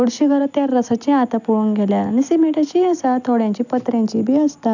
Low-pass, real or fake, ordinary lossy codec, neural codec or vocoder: 7.2 kHz; real; none; none